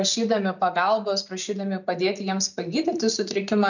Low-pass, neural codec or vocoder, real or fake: 7.2 kHz; none; real